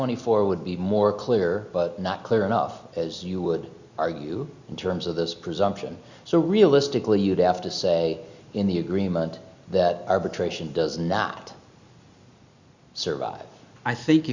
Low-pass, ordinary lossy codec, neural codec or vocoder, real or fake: 7.2 kHz; Opus, 64 kbps; none; real